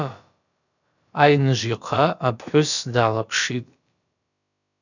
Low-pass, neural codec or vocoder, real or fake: 7.2 kHz; codec, 16 kHz, about 1 kbps, DyCAST, with the encoder's durations; fake